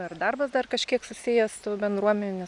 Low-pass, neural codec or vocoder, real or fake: 10.8 kHz; none; real